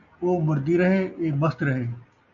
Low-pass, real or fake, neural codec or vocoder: 7.2 kHz; real; none